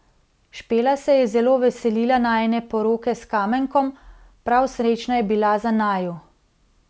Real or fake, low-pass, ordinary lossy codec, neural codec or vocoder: real; none; none; none